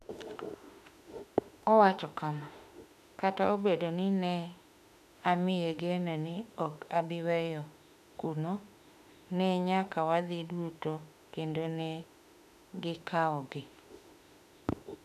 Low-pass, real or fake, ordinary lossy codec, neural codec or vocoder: 14.4 kHz; fake; none; autoencoder, 48 kHz, 32 numbers a frame, DAC-VAE, trained on Japanese speech